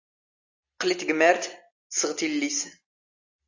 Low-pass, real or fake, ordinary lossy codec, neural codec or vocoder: 7.2 kHz; real; AAC, 48 kbps; none